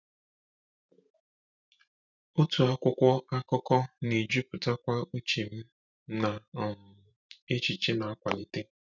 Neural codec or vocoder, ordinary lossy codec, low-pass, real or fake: vocoder, 44.1 kHz, 128 mel bands every 512 samples, BigVGAN v2; none; 7.2 kHz; fake